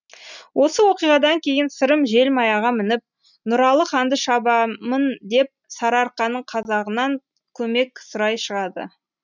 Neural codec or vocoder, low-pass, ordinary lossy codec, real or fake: none; 7.2 kHz; none; real